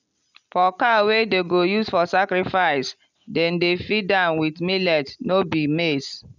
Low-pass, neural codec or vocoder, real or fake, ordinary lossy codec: 7.2 kHz; none; real; none